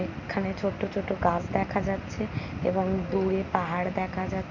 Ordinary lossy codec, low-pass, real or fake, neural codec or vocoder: none; 7.2 kHz; real; none